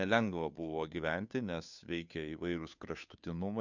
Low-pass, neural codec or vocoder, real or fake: 7.2 kHz; codec, 16 kHz, 2 kbps, FunCodec, trained on Chinese and English, 25 frames a second; fake